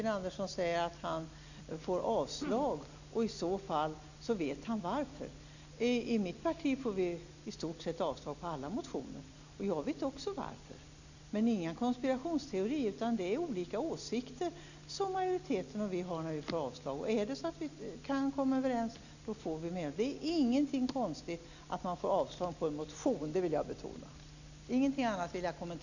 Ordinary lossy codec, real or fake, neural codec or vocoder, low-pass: none; real; none; 7.2 kHz